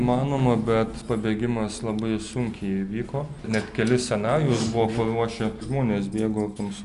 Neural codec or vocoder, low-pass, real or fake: none; 10.8 kHz; real